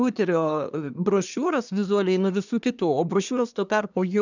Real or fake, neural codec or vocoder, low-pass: fake; codec, 24 kHz, 1 kbps, SNAC; 7.2 kHz